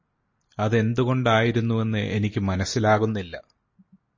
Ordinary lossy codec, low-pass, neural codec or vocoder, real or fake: MP3, 32 kbps; 7.2 kHz; none; real